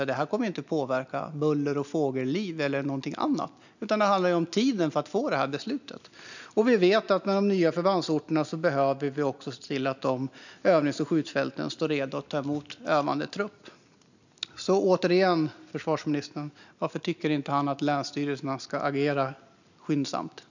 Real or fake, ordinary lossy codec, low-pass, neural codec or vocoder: real; none; 7.2 kHz; none